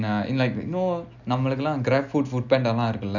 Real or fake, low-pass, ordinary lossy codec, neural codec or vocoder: real; 7.2 kHz; none; none